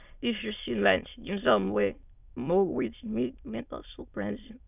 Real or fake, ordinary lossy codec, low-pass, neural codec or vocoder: fake; none; 3.6 kHz; autoencoder, 22.05 kHz, a latent of 192 numbers a frame, VITS, trained on many speakers